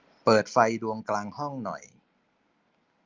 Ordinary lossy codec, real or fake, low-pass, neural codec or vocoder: Opus, 24 kbps; real; 7.2 kHz; none